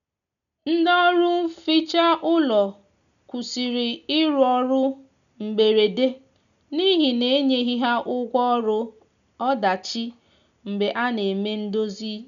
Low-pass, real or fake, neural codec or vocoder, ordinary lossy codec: 7.2 kHz; real; none; none